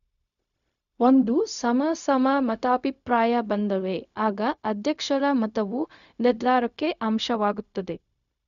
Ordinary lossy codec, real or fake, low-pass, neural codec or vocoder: none; fake; 7.2 kHz; codec, 16 kHz, 0.4 kbps, LongCat-Audio-Codec